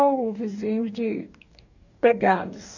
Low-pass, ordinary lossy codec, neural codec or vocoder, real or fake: 7.2 kHz; none; codec, 44.1 kHz, 2.6 kbps, DAC; fake